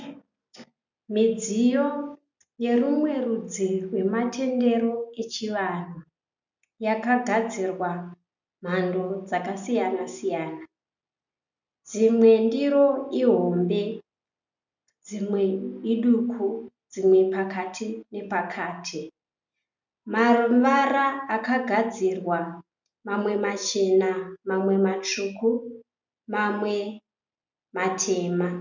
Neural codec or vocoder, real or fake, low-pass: none; real; 7.2 kHz